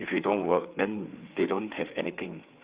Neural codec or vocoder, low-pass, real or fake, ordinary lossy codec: codec, 16 kHz, 4 kbps, FreqCodec, larger model; 3.6 kHz; fake; Opus, 64 kbps